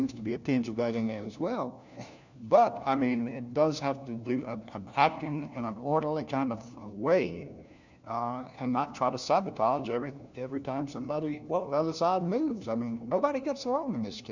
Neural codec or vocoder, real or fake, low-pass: codec, 16 kHz, 1 kbps, FunCodec, trained on LibriTTS, 50 frames a second; fake; 7.2 kHz